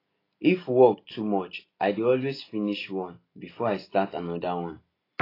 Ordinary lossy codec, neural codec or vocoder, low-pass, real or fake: AAC, 24 kbps; none; 5.4 kHz; real